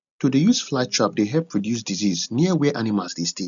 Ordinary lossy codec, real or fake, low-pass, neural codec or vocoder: none; real; 7.2 kHz; none